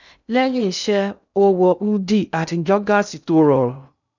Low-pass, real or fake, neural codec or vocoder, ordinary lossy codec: 7.2 kHz; fake; codec, 16 kHz in and 24 kHz out, 0.6 kbps, FocalCodec, streaming, 2048 codes; none